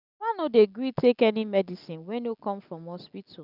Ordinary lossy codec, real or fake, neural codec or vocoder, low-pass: none; real; none; 5.4 kHz